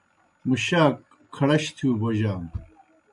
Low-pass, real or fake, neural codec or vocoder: 10.8 kHz; real; none